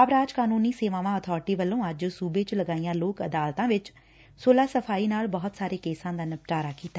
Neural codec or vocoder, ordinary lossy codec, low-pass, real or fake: none; none; none; real